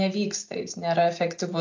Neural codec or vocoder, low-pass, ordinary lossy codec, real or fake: none; 7.2 kHz; AAC, 48 kbps; real